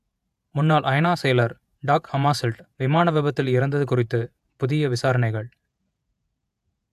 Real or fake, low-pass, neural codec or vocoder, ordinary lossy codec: fake; 14.4 kHz; vocoder, 48 kHz, 128 mel bands, Vocos; AAC, 96 kbps